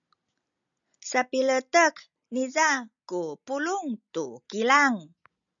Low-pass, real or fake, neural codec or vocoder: 7.2 kHz; real; none